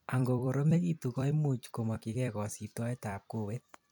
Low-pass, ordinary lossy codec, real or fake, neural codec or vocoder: none; none; fake; vocoder, 44.1 kHz, 128 mel bands every 256 samples, BigVGAN v2